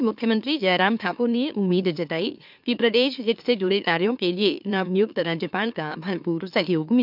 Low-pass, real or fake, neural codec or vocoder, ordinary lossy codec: 5.4 kHz; fake; autoencoder, 44.1 kHz, a latent of 192 numbers a frame, MeloTTS; none